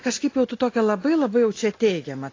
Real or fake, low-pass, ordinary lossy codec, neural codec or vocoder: real; 7.2 kHz; AAC, 32 kbps; none